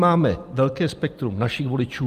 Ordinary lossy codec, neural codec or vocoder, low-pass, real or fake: Opus, 32 kbps; vocoder, 44.1 kHz, 128 mel bands every 256 samples, BigVGAN v2; 14.4 kHz; fake